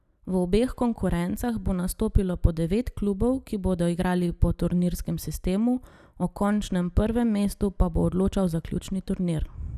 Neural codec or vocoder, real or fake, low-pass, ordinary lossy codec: none; real; 14.4 kHz; none